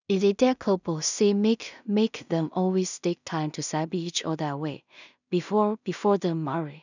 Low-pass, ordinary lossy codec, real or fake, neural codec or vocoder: 7.2 kHz; none; fake; codec, 16 kHz in and 24 kHz out, 0.4 kbps, LongCat-Audio-Codec, two codebook decoder